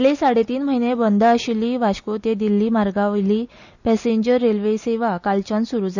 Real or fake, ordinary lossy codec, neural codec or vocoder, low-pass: real; none; none; 7.2 kHz